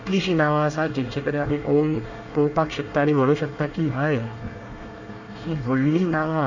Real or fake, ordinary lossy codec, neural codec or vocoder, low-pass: fake; none; codec, 24 kHz, 1 kbps, SNAC; 7.2 kHz